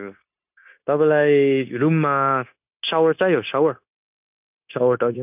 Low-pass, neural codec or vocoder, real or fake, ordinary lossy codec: 3.6 kHz; codec, 16 kHz, 0.9 kbps, LongCat-Audio-Codec; fake; none